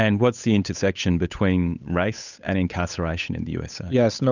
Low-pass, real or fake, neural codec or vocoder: 7.2 kHz; fake; codec, 16 kHz, 8 kbps, FunCodec, trained on Chinese and English, 25 frames a second